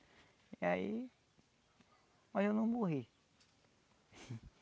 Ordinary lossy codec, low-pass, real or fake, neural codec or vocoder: none; none; real; none